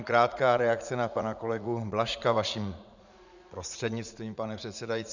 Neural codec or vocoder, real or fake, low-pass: vocoder, 44.1 kHz, 80 mel bands, Vocos; fake; 7.2 kHz